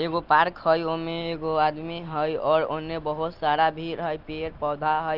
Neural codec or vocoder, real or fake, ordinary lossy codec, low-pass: none; real; Opus, 24 kbps; 5.4 kHz